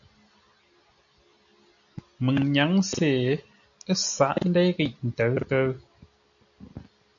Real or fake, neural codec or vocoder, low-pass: real; none; 7.2 kHz